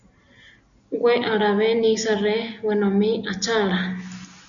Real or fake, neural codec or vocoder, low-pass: real; none; 7.2 kHz